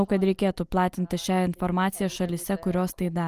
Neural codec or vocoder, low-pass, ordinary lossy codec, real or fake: none; 14.4 kHz; Opus, 32 kbps; real